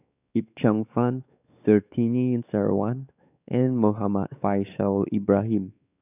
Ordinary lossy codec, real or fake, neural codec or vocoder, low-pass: none; fake; codec, 16 kHz, 4 kbps, X-Codec, WavLM features, trained on Multilingual LibriSpeech; 3.6 kHz